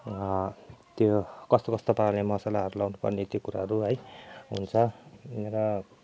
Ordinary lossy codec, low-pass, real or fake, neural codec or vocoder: none; none; real; none